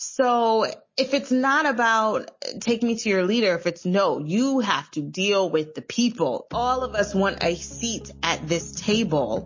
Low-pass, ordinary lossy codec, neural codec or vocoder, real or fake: 7.2 kHz; MP3, 32 kbps; none; real